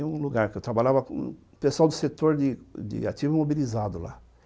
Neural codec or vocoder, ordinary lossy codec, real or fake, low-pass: none; none; real; none